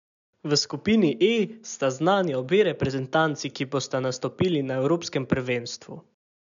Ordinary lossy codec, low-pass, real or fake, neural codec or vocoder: none; 7.2 kHz; real; none